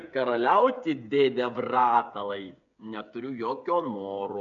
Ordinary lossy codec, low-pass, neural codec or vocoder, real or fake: MP3, 64 kbps; 7.2 kHz; codec, 16 kHz, 8 kbps, FreqCodec, smaller model; fake